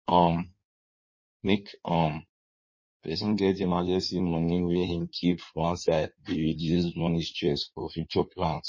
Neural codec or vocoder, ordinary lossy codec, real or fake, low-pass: codec, 16 kHz in and 24 kHz out, 1.1 kbps, FireRedTTS-2 codec; MP3, 32 kbps; fake; 7.2 kHz